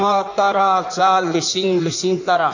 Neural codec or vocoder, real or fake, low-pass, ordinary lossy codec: codec, 16 kHz in and 24 kHz out, 1.1 kbps, FireRedTTS-2 codec; fake; 7.2 kHz; none